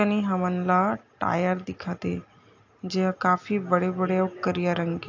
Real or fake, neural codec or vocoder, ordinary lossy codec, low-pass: real; none; AAC, 48 kbps; 7.2 kHz